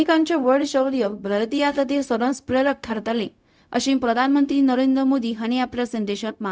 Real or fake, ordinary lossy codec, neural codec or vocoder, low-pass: fake; none; codec, 16 kHz, 0.4 kbps, LongCat-Audio-Codec; none